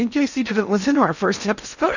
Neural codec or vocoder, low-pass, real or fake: codec, 16 kHz in and 24 kHz out, 0.6 kbps, FocalCodec, streaming, 2048 codes; 7.2 kHz; fake